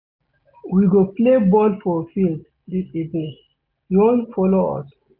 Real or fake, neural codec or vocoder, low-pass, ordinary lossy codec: real; none; 5.4 kHz; none